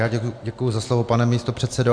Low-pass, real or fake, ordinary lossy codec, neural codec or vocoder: 9.9 kHz; real; AAC, 64 kbps; none